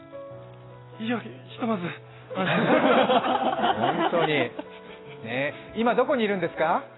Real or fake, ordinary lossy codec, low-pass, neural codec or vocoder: real; AAC, 16 kbps; 7.2 kHz; none